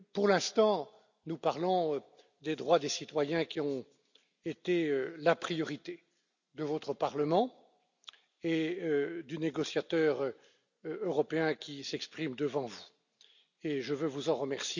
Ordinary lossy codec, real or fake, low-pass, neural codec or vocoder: none; real; 7.2 kHz; none